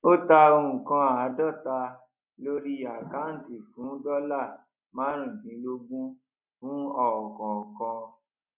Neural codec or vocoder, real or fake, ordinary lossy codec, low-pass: none; real; none; 3.6 kHz